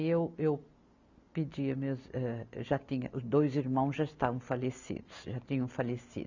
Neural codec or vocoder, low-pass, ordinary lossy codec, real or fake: none; 7.2 kHz; none; real